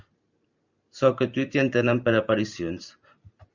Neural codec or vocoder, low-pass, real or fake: vocoder, 44.1 kHz, 128 mel bands every 512 samples, BigVGAN v2; 7.2 kHz; fake